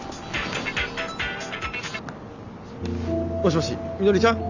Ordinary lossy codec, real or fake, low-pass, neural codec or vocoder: none; real; 7.2 kHz; none